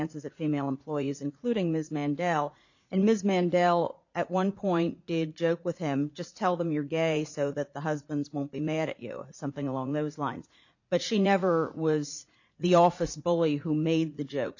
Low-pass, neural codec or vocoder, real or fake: 7.2 kHz; vocoder, 44.1 kHz, 80 mel bands, Vocos; fake